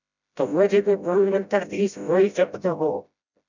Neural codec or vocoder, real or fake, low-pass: codec, 16 kHz, 0.5 kbps, FreqCodec, smaller model; fake; 7.2 kHz